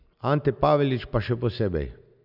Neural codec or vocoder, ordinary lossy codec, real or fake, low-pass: none; none; real; 5.4 kHz